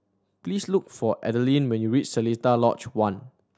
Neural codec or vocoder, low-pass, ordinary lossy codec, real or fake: none; none; none; real